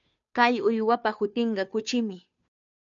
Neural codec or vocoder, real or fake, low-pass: codec, 16 kHz, 2 kbps, FunCodec, trained on Chinese and English, 25 frames a second; fake; 7.2 kHz